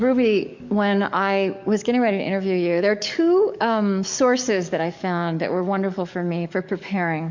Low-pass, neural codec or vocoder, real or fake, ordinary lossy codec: 7.2 kHz; codec, 44.1 kHz, 7.8 kbps, DAC; fake; MP3, 64 kbps